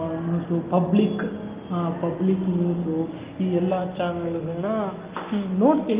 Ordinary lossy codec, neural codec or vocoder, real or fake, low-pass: Opus, 32 kbps; none; real; 3.6 kHz